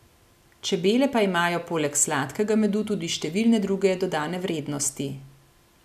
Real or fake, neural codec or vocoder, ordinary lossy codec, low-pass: real; none; none; 14.4 kHz